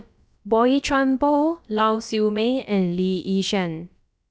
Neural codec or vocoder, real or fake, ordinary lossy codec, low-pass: codec, 16 kHz, about 1 kbps, DyCAST, with the encoder's durations; fake; none; none